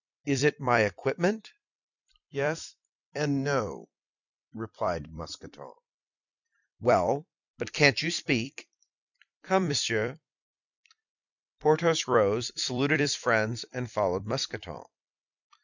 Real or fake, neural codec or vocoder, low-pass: fake; vocoder, 44.1 kHz, 128 mel bands every 256 samples, BigVGAN v2; 7.2 kHz